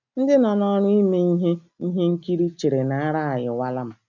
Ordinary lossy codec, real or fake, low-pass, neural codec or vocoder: none; real; 7.2 kHz; none